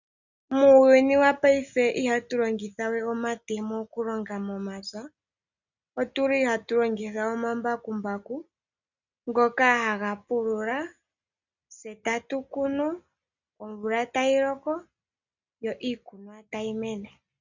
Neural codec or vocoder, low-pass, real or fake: none; 7.2 kHz; real